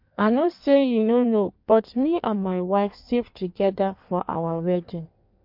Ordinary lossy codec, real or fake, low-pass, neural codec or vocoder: MP3, 48 kbps; fake; 5.4 kHz; codec, 16 kHz in and 24 kHz out, 1.1 kbps, FireRedTTS-2 codec